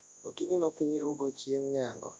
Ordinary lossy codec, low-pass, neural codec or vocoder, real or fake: none; 10.8 kHz; codec, 24 kHz, 0.9 kbps, WavTokenizer, large speech release; fake